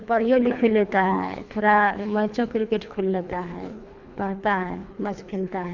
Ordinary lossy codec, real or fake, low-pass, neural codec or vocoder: none; fake; 7.2 kHz; codec, 24 kHz, 3 kbps, HILCodec